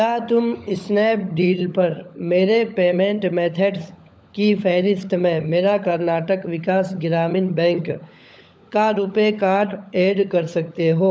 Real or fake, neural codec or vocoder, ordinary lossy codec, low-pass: fake; codec, 16 kHz, 16 kbps, FunCodec, trained on LibriTTS, 50 frames a second; none; none